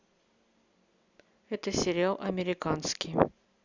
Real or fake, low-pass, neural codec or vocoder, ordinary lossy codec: real; 7.2 kHz; none; none